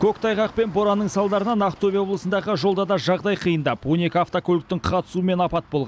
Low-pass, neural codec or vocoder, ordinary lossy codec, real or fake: none; none; none; real